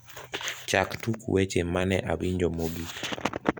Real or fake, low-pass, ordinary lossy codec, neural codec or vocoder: fake; none; none; vocoder, 44.1 kHz, 128 mel bands every 256 samples, BigVGAN v2